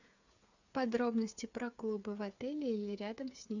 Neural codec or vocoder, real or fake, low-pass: codec, 16 kHz, 8 kbps, FreqCodec, smaller model; fake; 7.2 kHz